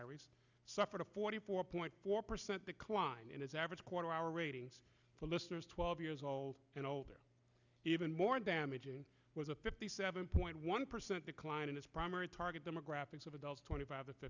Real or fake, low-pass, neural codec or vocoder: real; 7.2 kHz; none